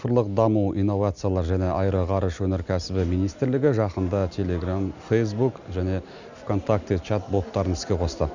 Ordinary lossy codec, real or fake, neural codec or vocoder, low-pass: none; real; none; 7.2 kHz